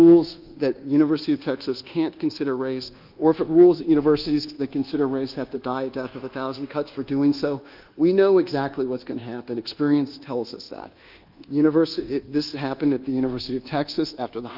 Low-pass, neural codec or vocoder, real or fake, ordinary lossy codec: 5.4 kHz; codec, 24 kHz, 1.2 kbps, DualCodec; fake; Opus, 32 kbps